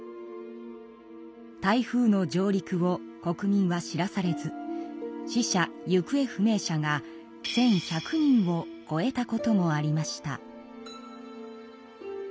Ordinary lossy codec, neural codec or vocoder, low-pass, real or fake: none; none; none; real